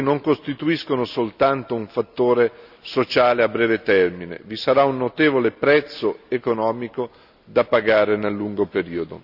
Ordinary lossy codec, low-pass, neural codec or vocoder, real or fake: none; 5.4 kHz; none; real